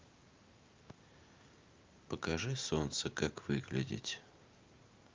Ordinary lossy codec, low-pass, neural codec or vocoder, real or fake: Opus, 24 kbps; 7.2 kHz; none; real